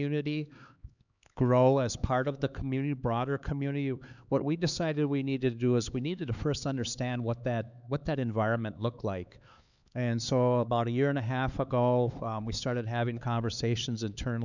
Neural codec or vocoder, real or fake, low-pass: codec, 16 kHz, 4 kbps, X-Codec, HuBERT features, trained on LibriSpeech; fake; 7.2 kHz